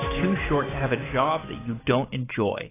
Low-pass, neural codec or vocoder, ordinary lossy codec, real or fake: 3.6 kHz; codec, 16 kHz, 6 kbps, DAC; AAC, 16 kbps; fake